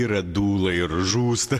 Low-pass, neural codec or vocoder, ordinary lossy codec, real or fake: 14.4 kHz; none; AAC, 64 kbps; real